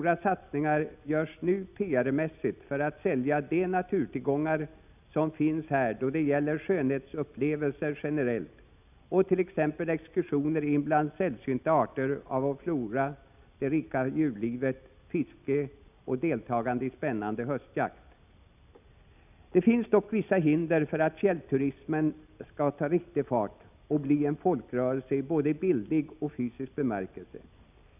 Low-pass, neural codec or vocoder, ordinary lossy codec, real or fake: 3.6 kHz; none; none; real